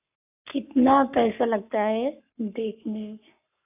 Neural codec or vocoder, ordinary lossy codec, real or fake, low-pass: codec, 44.1 kHz, 7.8 kbps, Pupu-Codec; AAC, 32 kbps; fake; 3.6 kHz